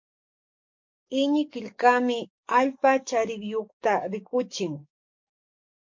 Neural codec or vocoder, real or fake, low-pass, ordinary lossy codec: codec, 44.1 kHz, 7.8 kbps, DAC; fake; 7.2 kHz; MP3, 48 kbps